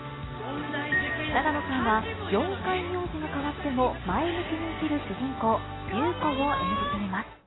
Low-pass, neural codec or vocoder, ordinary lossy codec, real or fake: 7.2 kHz; none; AAC, 16 kbps; real